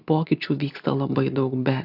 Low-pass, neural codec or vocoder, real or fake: 5.4 kHz; none; real